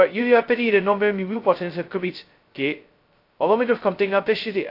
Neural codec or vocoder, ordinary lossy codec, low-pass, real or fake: codec, 16 kHz, 0.2 kbps, FocalCodec; AAC, 32 kbps; 5.4 kHz; fake